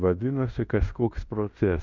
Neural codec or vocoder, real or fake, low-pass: codec, 16 kHz in and 24 kHz out, 0.9 kbps, LongCat-Audio-Codec, fine tuned four codebook decoder; fake; 7.2 kHz